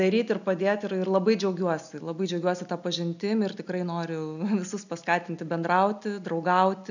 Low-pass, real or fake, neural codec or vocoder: 7.2 kHz; real; none